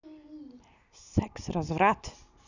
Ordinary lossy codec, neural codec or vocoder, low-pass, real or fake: none; none; 7.2 kHz; real